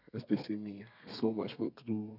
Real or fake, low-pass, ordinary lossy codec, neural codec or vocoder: fake; 5.4 kHz; none; codec, 32 kHz, 1.9 kbps, SNAC